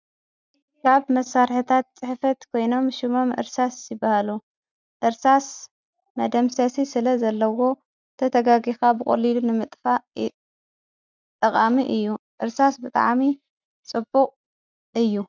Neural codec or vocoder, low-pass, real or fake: none; 7.2 kHz; real